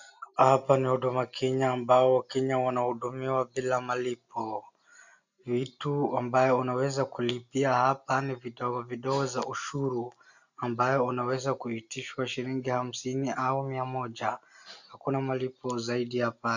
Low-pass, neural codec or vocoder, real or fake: 7.2 kHz; none; real